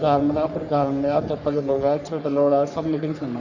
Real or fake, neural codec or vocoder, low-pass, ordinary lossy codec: fake; codec, 44.1 kHz, 3.4 kbps, Pupu-Codec; 7.2 kHz; Opus, 64 kbps